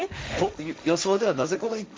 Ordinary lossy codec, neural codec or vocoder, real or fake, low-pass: none; codec, 16 kHz, 1.1 kbps, Voila-Tokenizer; fake; none